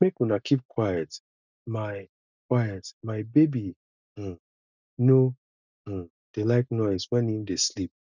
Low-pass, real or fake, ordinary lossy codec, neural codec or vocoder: 7.2 kHz; real; none; none